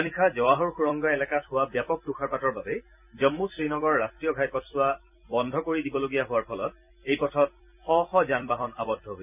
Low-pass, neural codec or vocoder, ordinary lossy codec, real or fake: 3.6 kHz; vocoder, 44.1 kHz, 128 mel bands every 256 samples, BigVGAN v2; none; fake